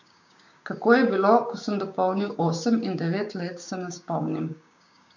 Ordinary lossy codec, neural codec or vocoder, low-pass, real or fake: none; codec, 16 kHz, 6 kbps, DAC; 7.2 kHz; fake